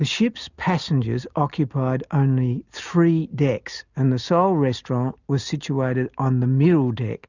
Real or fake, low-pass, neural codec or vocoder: real; 7.2 kHz; none